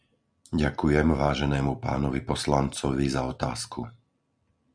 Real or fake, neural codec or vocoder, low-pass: real; none; 9.9 kHz